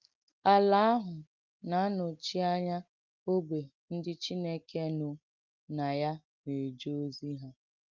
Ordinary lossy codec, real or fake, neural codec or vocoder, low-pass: Opus, 32 kbps; real; none; 7.2 kHz